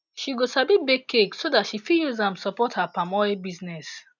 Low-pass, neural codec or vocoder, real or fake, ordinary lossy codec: 7.2 kHz; none; real; none